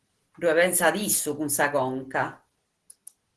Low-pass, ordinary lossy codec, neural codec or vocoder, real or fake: 10.8 kHz; Opus, 16 kbps; none; real